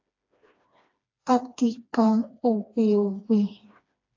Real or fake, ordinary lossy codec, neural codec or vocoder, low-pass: fake; AAC, 48 kbps; codec, 16 kHz, 2 kbps, FreqCodec, smaller model; 7.2 kHz